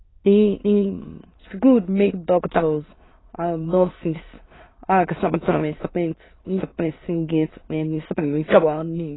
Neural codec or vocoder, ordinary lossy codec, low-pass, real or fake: autoencoder, 22.05 kHz, a latent of 192 numbers a frame, VITS, trained on many speakers; AAC, 16 kbps; 7.2 kHz; fake